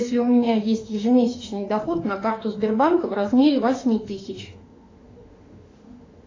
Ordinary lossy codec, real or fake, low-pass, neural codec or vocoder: AAC, 32 kbps; fake; 7.2 kHz; autoencoder, 48 kHz, 32 numbers a frame, DAC-VAE, trained on Japanese speech